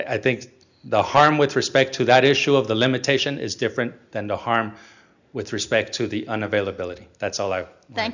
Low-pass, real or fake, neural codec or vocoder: 7.2 kHz; real; none